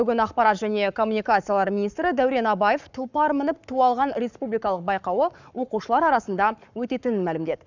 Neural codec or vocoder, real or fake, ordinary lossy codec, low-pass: codec, 44.1 kHz, 7.8 kbps, Pupu-Codec; fake; none; 7.2 kHz